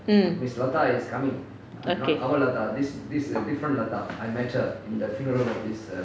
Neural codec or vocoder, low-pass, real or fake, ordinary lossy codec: none; none; real; none